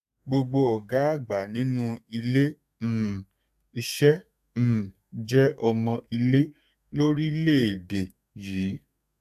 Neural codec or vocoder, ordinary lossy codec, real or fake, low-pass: codec, 32 kHz, 1.9 kbps, SNAC; AAC, 96 kbps; fake; 14.4 kHz